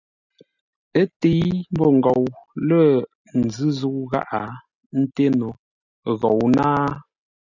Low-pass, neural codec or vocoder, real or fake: 7.2 kHz; none; real